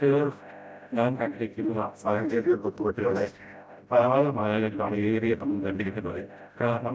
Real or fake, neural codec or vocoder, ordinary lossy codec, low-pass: fake; codec, 16 kHz, 0.5 kbps, FreqCodec, smaller model; none; none